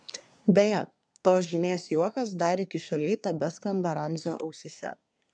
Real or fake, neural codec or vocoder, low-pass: fake; codec, 24 kHz, 1 kbps, SNAC; 9.9 kHz